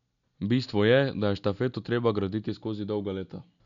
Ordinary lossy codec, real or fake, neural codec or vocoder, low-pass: none; real; none; 7.2 kHz